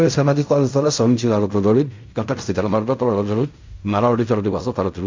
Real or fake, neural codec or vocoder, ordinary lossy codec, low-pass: fake; codec, 16 kHz in and 24 kHz out, 0.4 kbps, LongCat-Audio-Codec, fine tuned four codebook decoder; MP3, 64 kbps; 7.2 kHz